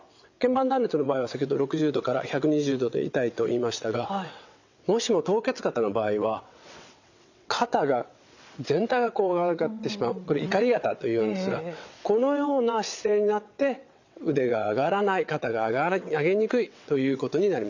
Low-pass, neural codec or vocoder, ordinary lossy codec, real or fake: 7.2 kHz; vocoder, 22.05 kHz, 80 mel bands, WaveNeXt; none; fake